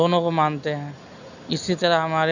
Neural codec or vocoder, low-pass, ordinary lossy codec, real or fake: none; 7.2 kHz; none; real